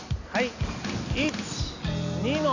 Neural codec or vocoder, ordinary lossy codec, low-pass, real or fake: none; none; 7.2 kHz; real